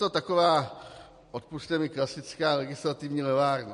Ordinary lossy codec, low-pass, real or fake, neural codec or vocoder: MP3, 48 kbps; 14.4 kHz; real; none